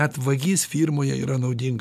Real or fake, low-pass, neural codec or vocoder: real; 14.4 kHz; none